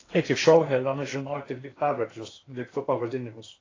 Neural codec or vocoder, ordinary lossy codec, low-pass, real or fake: codec, 16 kHz in and 24 kHz out, 0.8 kbps, FocalCodec, streaming, 65536 codes; AAC, 32 kbps; 7.2 kHz; fake